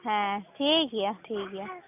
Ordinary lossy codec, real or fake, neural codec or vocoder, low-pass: none; real; none; 3.6 kHz